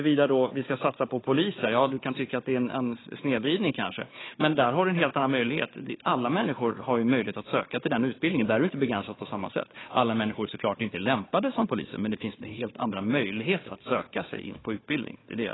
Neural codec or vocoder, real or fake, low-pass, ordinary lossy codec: codec, 16 kHz, 4 kbps, FunCodec, trained on Chinese and English, 50 frames a second; fake; 7.2 kHz; AAC, 16 kbps